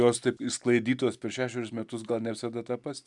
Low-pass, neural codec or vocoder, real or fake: 10.8 kHz; none; real